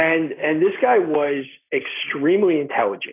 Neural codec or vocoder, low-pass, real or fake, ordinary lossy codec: none; 3.6 kHz; real; AAC, 24 kbps